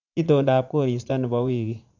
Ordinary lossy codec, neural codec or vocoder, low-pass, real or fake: none; vocoder, 44.1 kHz, 80 mel bands, Vocos; 7.2 kHz; fake